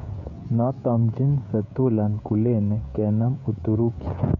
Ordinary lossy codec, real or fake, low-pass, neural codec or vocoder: none; fake; 7.2 kHz; codec, 16 kHz, 16 kbps, FreqCodec, smaller model